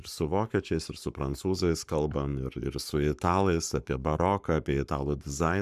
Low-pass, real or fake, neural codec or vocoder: 14.4 kHz; fake; codec, 44.1 kHz, 7.8 kbps, Pupu-Codec